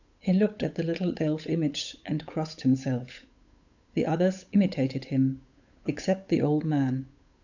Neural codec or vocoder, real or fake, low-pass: codec, 16 kHz, 8 kbps, FunCodec, trained on Chinese and English, 25 frames a second; fake; 7.2 kHz